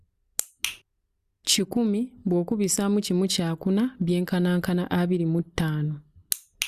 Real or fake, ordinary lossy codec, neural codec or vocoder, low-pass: real; Opus, 64 kbps; none; 14.4 kHz